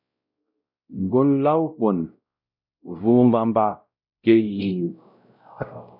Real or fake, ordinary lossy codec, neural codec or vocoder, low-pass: fake; MP3, 48 kbps; codec, 16 kHz, 0.5 kbps, X-Codec, WavLM features, trained on Multilingual LibriSpeech; 5.4 kHz